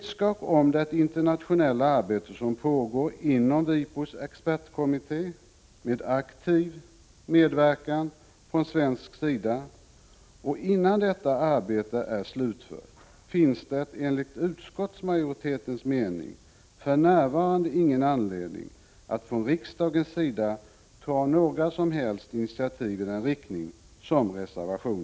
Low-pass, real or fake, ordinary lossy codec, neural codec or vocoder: none; real; none; none